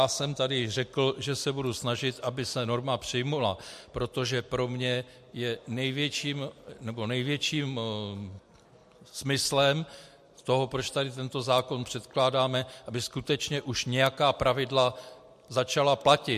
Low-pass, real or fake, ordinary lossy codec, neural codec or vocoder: 14.4 kHz; real; MP3, 64 kbps; none